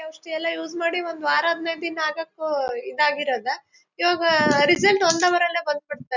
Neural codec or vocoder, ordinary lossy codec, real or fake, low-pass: none; none; real; 7.2 kHz